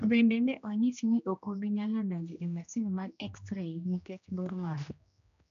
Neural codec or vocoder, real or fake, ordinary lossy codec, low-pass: codec, 16 kHz, 1 kbps, X-Codec, HuBERT features, trained on general audio; fake; none; 7.2 kHz